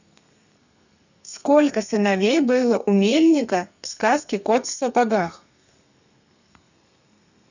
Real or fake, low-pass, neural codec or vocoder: fake; 7.2 kHz; codec, 32 kHz, 1.9 kbps, SNAC